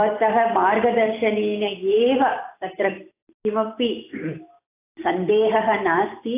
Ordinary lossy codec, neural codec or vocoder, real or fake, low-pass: AAC, 24 kbps; none; real; 3.6 kHz